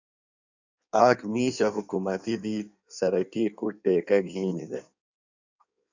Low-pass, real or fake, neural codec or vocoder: 7.2 kHz; fake; codec, 16 kHz in and 24 kHz out, 1.1 kbps, FireRedTTS-2 codec